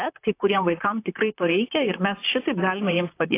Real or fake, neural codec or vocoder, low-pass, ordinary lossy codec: fake; vocoder, 44.1 kHz, 128 mel bands, Pupu-Vocoder; 3.6 kHz; AAC, 24 kbps